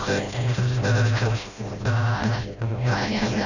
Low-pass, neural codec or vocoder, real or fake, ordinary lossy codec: 7.2 kHz; codec, 16 kHz, 0.5 kbps, FreqCodec, smaller model; fake; none